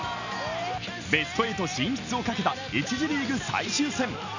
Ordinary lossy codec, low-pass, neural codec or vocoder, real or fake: none; 7.2 kHz; none; real